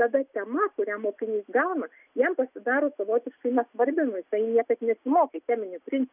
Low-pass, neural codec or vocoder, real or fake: 3.6 kHz; none; real